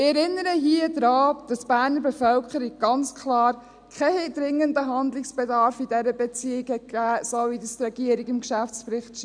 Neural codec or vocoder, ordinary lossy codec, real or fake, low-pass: none; none; real; 9.9 kHz